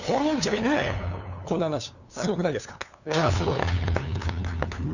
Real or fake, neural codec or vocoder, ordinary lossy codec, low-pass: fake; codec, 16 kHz, 2 kbps, FunCodec, trained on LibriTTS, 25 frames a second; none; 7.2 kHz